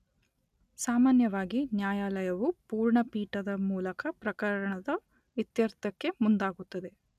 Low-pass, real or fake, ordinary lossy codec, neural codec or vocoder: 14.4 kHz; real; none; none